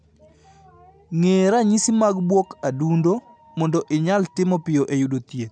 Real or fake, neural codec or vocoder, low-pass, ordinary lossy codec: real; none; 9.9 kHz; none